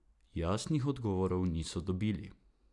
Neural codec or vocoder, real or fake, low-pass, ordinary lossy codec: none; real; 10.8 kHz; none